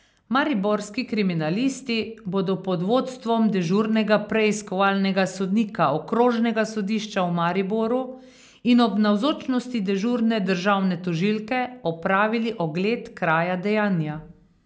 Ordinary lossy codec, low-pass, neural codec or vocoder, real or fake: none; none; none; real